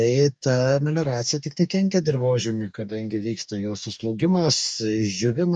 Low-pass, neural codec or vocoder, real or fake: 9.9 kHz; codec, 44.1 kHz, 2.6 kbps, DAC; fake